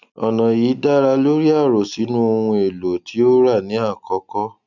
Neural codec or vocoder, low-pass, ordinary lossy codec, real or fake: none; 7.2 kHz; none; real